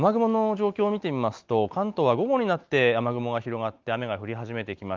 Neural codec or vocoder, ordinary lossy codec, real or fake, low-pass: none; Opus, 24 kbps; real; 7.2 kHz